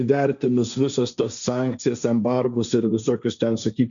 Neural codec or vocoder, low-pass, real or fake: codec, 16 kHz, 1.1 kbps, Voila-Tokenizer; 7.2 kHz; fake